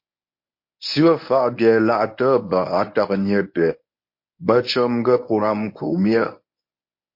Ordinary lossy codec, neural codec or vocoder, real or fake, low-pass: MP3, 32 kbps; codec, 24 kHz, 0.9 kbps, WavTokenizer, medium speech release version 1; fake; 5.4 kHz